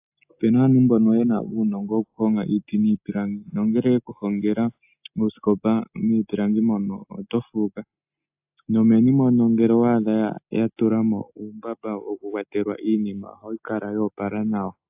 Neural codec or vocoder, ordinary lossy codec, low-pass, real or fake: none; AAC, 32 kbps; 3.6 kHz; real